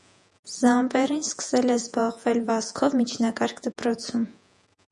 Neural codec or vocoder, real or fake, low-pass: vocoder, 48 kHz, 128 mel bands, Vocos; fake; 10.8 kHz